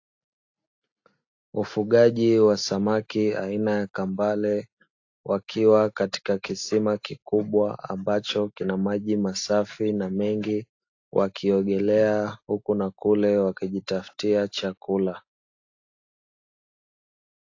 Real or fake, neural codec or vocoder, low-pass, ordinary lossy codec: real; none; 7.2 kHz; AAC, 48 kbps